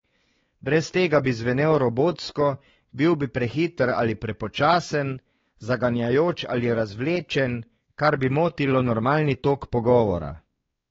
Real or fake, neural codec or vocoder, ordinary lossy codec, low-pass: fake; codec, 16 kHz, 4 kbps, X-Codec, WavLM features, trained on Multilingual LibriSpeech; AAC, 24 kbps; 7.2 kHz